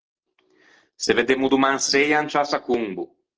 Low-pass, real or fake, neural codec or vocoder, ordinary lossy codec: 7.2 kHz; real; none; Opus, 16 kbps